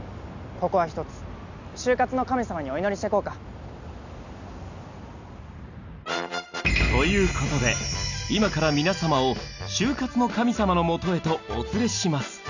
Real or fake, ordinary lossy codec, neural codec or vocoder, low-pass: real; none; none; 7.2 kHz